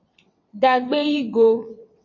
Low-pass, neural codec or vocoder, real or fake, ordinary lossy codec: 7.2 kHz; vocoder, 22.05 kHz, 80 mel bands, WaveNeXt; fake; MP3, 32 kbps